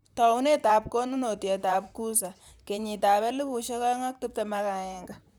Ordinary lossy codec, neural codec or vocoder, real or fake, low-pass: none; vocoder, 44.1 kHz, 128 mel bands, Pupu-Vocoder; fake; none